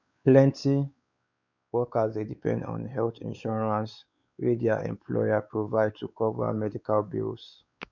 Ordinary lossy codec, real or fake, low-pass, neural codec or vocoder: none; fake; 7.2 kHz; codec, 16 kHz, 4 kbps, X-Codec, WavLM features, trained on Multilingual LibriSpeech